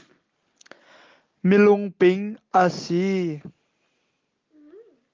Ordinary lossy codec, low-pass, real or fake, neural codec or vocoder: Opus, 24 kbps; 7.2 kHz; real; none